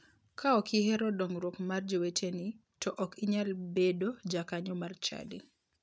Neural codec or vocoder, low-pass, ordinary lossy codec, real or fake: none; none; none; real